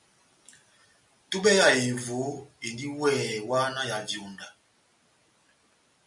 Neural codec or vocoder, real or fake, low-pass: none; real; 10.8 kHz